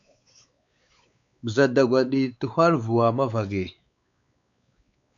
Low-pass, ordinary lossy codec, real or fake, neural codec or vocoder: 7.2 kHz; AAC, 64 kbps; fake; codec, 16 kHz, 4 kbps, X-Codec, WavLM features, trained on Multilingual LibriSpeech